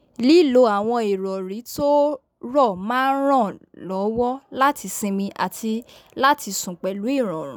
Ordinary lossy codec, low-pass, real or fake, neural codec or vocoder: none; none; real; none